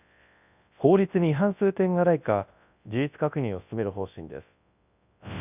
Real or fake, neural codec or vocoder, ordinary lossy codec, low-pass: fake; codec, 24 kHz, 0.9 kbps, WavTokenizer, large speech release; none; 3.6 kHz